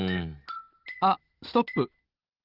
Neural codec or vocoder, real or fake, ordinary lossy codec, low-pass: none; real; Opus, 16 kbps; 5.4 kHz